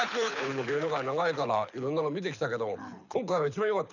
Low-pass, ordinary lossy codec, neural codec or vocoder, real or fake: 7.2 kHz; none; codec, 24 kHz, 6 kbps, HILCodec; fake